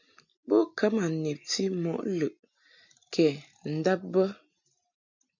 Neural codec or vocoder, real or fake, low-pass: none; real; 7.2 kHz